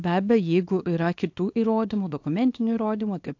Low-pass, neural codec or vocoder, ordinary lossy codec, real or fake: 7.2 kHz; codec, 24 kHz, 0.9 kbps, WavTokenizer, small release; AAC, 48 kbps; fake